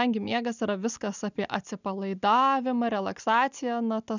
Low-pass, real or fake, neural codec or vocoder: 7.2 kHz; real; none